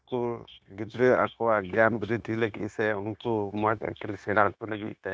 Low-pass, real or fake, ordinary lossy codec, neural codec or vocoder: none; fake; none; codec, 16 kHz, 0.9 kbps, LongCat-Audio-Codec